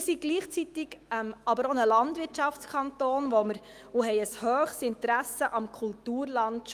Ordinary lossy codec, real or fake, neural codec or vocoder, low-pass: Opus, 32 kbps; fake; autoencoder, 48 kHz, 128 numbers a frame, DAC-VAE, trained on Japanese speech; 14.4 kHz